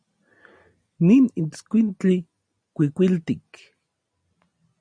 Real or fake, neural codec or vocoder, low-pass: real; none; 9.9 kHz